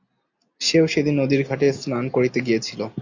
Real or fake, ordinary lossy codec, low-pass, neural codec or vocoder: real; AAC, 48 kbps; 7.2 kHz; none